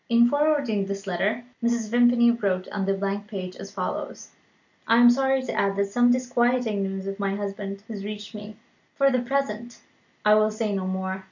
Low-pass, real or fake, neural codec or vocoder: 7.2 kHz; real; none